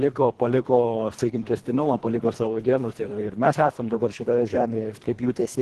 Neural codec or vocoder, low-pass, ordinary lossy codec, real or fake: codec, 24 kHz, 1.5 kbps, HILCodec; 10.8 kHz; Opus, 16 kbps; fake